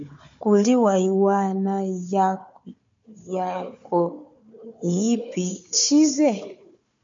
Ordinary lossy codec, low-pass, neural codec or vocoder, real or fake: MP3, 48 kbps; 7.2 kHz; codec, 16 kHz, 4 kbps, FunCodec, trained on Chinese and English, 50 frames a second; fake